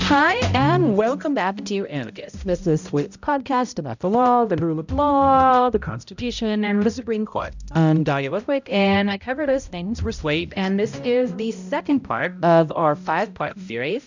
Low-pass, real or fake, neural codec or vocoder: 7.2 kHz; fake; codec, 16 kHz, 0.5 kbps, X-Codec, HuBERT features, trained on balanced general audio